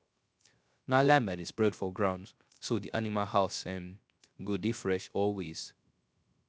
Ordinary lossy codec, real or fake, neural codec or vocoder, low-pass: none; fake; codec, 16 kHz, 0.3 kbps, FocalCodec; none